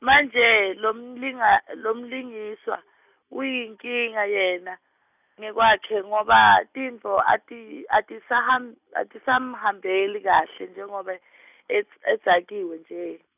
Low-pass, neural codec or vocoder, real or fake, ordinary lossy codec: 3.6 kHz; none; real; none